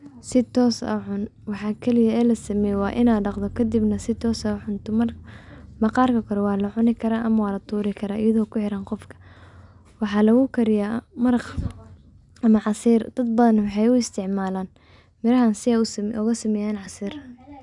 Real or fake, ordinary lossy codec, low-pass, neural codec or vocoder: real; none; 10.8 kHz; none